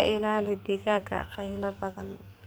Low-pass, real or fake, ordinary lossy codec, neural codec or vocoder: none; fake; none; codec, 44.1 kHz, 2.6 kbps, SNAC